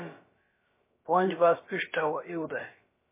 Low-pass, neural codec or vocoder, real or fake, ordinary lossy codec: 3.6 kHz; codec, 16 kHz, about 1 kbps, DyCAST, with the encoder's durations; fake; MP3, 16 kbps